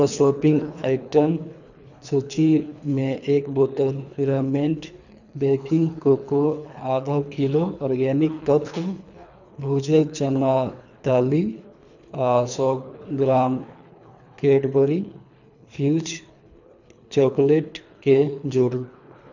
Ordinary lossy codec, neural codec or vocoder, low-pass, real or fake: none; codec, 24 kHz, 3 kbps, HILCodec; 7.2 kHz; fake